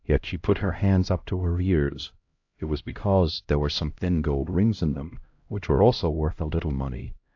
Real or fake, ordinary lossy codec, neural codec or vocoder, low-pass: fake; AAC, 48 kbps; codec, 16 kHz, 0.5 kbps, X-Codec, HuBERT features, trained on LibriSpeech; 7.2 kHz